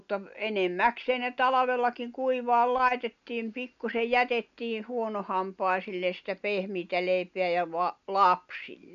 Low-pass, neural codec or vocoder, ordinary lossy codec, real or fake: 7.2 kHz; none; none; real